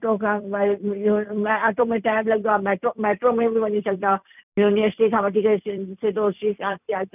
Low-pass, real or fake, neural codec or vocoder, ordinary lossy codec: 3.6 kHz; fake; vocoder, 44.1 kHz, 128 mel bands every 256 samples, BigVGAN v2; none